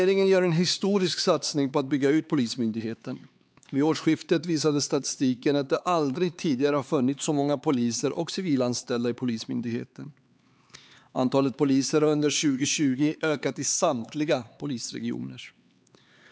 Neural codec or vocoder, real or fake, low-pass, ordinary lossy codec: codec, 16 kHz, 4 kbps, X-Codec, HuBERT features, trained on LibriSpeech; fake; none; none